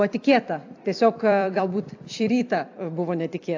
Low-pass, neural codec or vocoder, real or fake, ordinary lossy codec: 7.2 kHz; vocoder, 44.1 kHz, 128 mel bands every 256 samples, BigVGAN v2; fake; AAC, 48 kbps